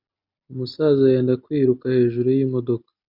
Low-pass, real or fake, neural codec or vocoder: 5.4 kHz; real; none